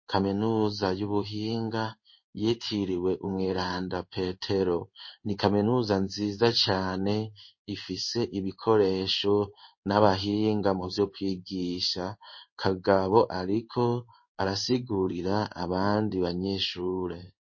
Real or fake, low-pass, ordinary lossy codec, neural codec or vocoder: fake; 7.2 kHz; MP3, 32 kbps; codec, 16 kHz in and 24 kHz out, 1 kbps, XY-Tokenizer